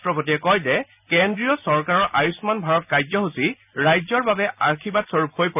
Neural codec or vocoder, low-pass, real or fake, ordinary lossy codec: none; 3.6 kHz; real; none